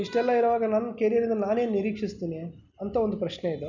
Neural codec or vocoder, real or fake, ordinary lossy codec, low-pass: none; real; none; 7.2 kHz